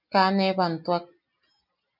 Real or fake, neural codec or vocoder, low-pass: real; none; 5.4 kHz